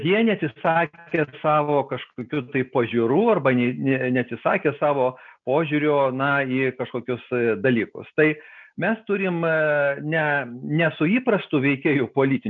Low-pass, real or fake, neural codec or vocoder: 7.2 kHz; real; none